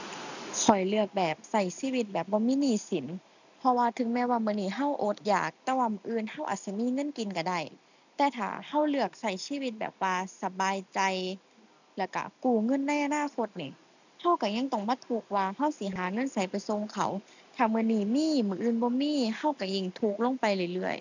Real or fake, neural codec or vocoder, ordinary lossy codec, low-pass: real; none; none; 7.2 kHz